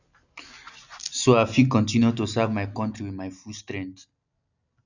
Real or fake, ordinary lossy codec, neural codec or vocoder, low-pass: real; none; none; 7.2 kHz